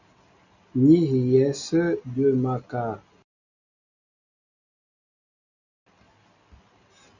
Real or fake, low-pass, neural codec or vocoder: real; 7.2 kHz; none